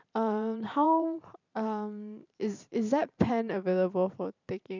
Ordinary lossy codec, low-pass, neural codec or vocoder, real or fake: none; 7.2 kHz; vocoder, 44.1 kHz, 128 mel bands every 512 samples, BigVGAN v2; fake